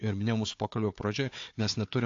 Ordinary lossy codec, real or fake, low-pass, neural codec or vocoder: AAC, 48 kbps; fake; 7.2 kHz; codec, 16 kHz, 4 kbps, FunCodec, trained on Chinese and English, 50 frames a second